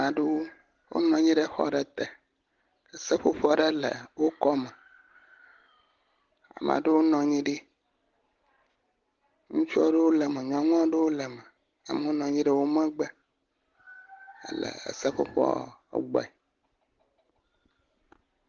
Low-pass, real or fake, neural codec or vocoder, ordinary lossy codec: 7.2 kHz; real; none; Opus, 16 kbps